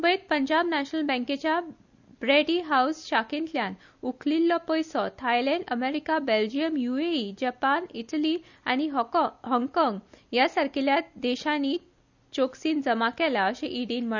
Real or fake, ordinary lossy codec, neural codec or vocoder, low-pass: real; none; none; 7.2 kHz